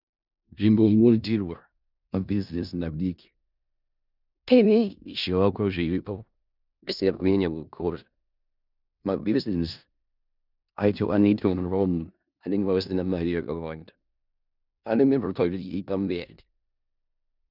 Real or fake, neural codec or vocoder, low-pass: fake; codec, 16 kHz in and 24 kHz out, 0.4 kbps, LongCat-Audio-Codec, four codebook decoder; 5.4 kHz